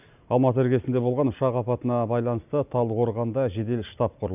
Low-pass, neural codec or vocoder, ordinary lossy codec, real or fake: 3.6 kHz; none; none; real